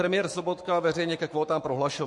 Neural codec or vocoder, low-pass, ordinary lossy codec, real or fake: codec, 44.1 kHz, 7.8 kbps, Pupu-Codec; 10.8 kHz; MP3, 48 kbps; fake